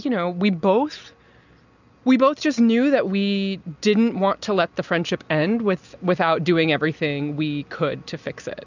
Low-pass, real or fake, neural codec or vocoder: 7.2 kHz; real; none